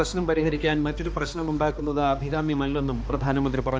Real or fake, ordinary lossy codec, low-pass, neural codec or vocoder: fake; none; none; codec, 16 kHz, 2 kbps, X-Codec, HuBERT features, trained on balanced general audio